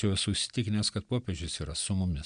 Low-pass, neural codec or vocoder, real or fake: 9.9 kHz; none; real